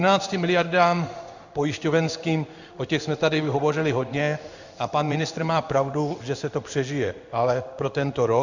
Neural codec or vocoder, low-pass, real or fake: codec, 16 kHz in and 24 kHz out, 1 kbps, XY-Tokenizer; 7.2 kHz; fake